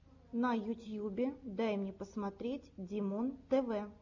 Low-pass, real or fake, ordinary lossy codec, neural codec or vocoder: 7.2 kHz; real; MP3, 48 kbps; none